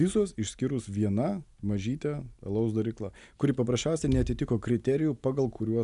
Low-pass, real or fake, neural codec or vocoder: 10.8 kHz; real; none